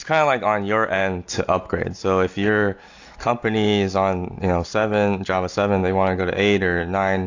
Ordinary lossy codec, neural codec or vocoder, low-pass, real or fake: AAC, 48 kbps; none; 7.2 kHz; real